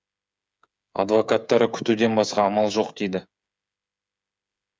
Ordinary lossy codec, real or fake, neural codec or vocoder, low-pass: none; fake; codec, 16 kHz, 8 kbps, FreqCodec, smaller model; none